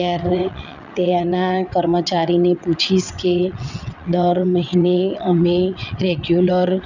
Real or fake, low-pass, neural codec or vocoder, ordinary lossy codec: fake; 7.2 kHz; vocoder, 22.05 kHz, 80 mel bands, WaveNeXt; none